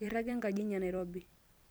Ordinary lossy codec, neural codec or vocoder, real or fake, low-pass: none; vocoder, 44.1 kHz, 128 mel bands every 256 samples, BigVGAN v2; fake; none